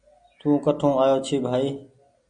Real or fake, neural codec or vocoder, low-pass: real; none; 9.9 kHz